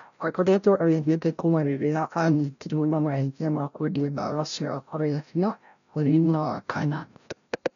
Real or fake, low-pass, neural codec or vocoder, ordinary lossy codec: fake; 7.2 kHz; codec, 16 kHz, 0.5 kbps, FreqCodec, larger model; none